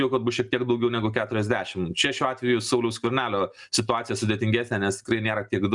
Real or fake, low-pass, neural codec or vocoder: real; 10.8 kHz; none